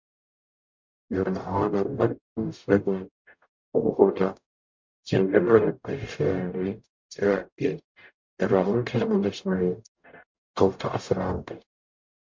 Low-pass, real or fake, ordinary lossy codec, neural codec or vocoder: 7.2 kHz; fake; MP3, 48 kbps; codec, 44.1 kHz, 0.9 kbps, DAC